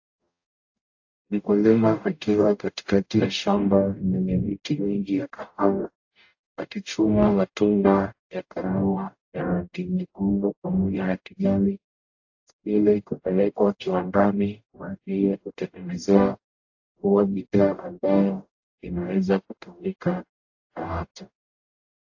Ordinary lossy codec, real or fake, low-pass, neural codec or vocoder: AAC, 48 kbps; fake; 7.2 kHz; codec, 44.1 kHz, 0.9 kbps, DAC